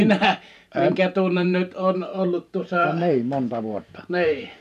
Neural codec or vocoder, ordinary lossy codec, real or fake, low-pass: vocoder, 44.1 kHz, 128 mel bands every 512 samples, BigVGAN v2; none; fake; 14.4 kHz